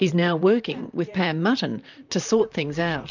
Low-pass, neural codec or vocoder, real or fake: 7.2 kHz; vocoder, 44.1 kHz, 128 mel bands, Pupu-Vocoder; fake